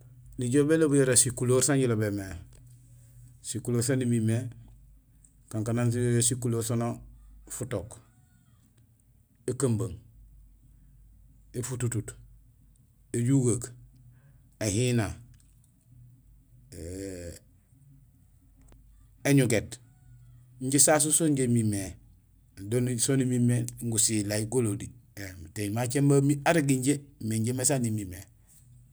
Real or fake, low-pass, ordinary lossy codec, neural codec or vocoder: real; none; none; none